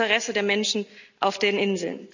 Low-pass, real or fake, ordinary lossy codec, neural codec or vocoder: 7.2 kHz; real; none; none